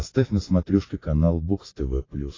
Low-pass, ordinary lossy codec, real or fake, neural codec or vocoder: 7.2 kHz; AAC, 32 kbps; real; none